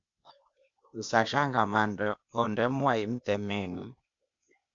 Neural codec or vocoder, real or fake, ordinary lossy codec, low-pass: codec, 16 kHz, 0.8 kbps, ZipCodec; fake; MP3, 64 kbps; 7.2 kHz